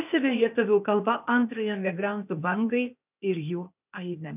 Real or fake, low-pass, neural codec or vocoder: fake; 3.6 kHz; codec, 16 kHz, 0.8 kbps, ZipCodec